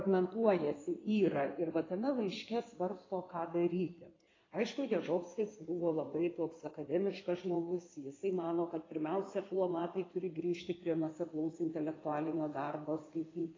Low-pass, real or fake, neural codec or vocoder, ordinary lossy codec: 7.2 kHz; fake; codec, 16 kHz in and 24 kHz out, 2.2 kbps, FireRedTTS-2 codec; AAC, 32 kbps